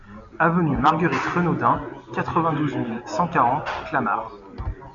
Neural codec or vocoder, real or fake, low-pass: none; real; 7.2 kHz